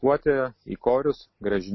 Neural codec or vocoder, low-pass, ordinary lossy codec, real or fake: none; 7.2 kHz; MP3, 24 kbps; real